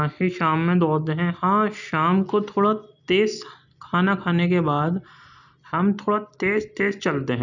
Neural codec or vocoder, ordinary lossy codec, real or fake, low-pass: none; none; real; 7.2 kHz